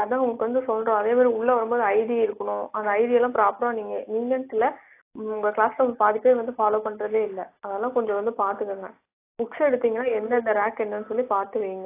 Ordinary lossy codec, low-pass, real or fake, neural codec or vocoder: AAC, 24 kbps; 3.6 kHz; real; none